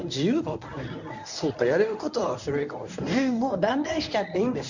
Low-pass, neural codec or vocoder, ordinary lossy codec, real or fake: 7.2 kHz; codec, 24 kHz, 0.9 kbps, WavTokenizer, medium speech release version 1; none; fake